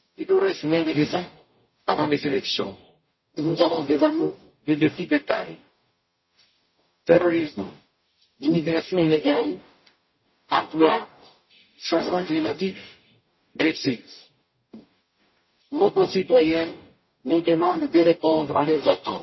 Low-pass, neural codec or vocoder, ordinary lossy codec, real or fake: 7.2 kHz; codec, 44.1 kHz, 0.9 kbps, DAC; MP3, 24 kbps; fake